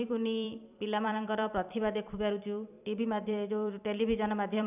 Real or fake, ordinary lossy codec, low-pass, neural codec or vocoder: fake; none; 3.6 kHz; vocoder, 44.1 kHz, 128 mel bands every 256 samples, BigVGAN v2